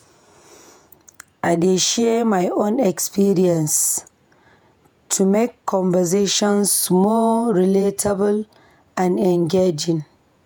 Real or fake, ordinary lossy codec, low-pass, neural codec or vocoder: fake; none; none; vocoder, 48 kHz, 128 mel bands, Vocos